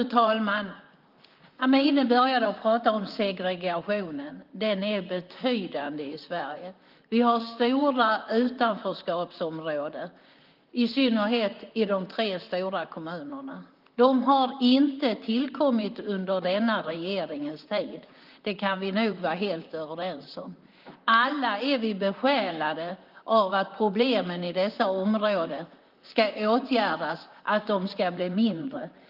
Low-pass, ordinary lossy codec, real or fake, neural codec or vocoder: 5.4 kHz; Opus, 24 kbps; fake; vocoder, 44.1 kHz, 128 mel bands, Pupu-Vocoder